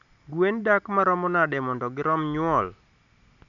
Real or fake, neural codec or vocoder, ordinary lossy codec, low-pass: real; none; none; 7.2 kHz